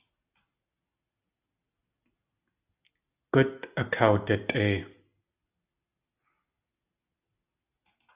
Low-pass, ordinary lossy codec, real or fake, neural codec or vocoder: 3.6 kHz; Opus, 64 kbps; real; none